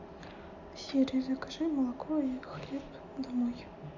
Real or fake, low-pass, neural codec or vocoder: real; 7.2 kHz; none